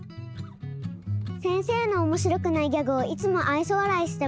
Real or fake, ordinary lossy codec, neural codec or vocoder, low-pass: real; none; none; none